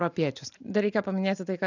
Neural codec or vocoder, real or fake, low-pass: none; real; 7.2 kHz